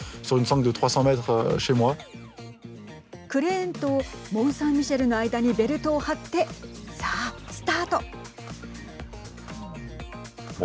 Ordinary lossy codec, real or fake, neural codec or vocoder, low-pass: none; real; none; none